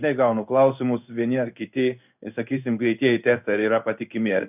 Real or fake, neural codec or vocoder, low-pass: fake; codec, 16 kHz in and 24 kHz out, 1 kbps, XY-Tokenizer; 3.6 kHz